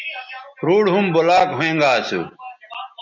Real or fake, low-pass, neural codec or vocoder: real; 7.2 kHz; none